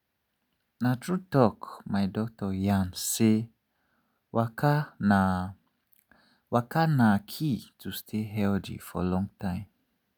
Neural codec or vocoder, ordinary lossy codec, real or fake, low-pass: none; none; real; none